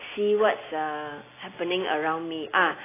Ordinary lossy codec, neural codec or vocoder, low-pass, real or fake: AAC, 16 kbps; none; 3.6 kHz; real